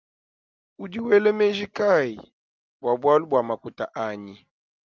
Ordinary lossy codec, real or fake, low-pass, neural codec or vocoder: Opus, 24 kbps; real; 7.2 kHz; none